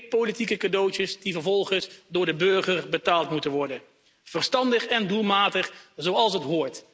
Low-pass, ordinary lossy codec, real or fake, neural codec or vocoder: none; none; real; none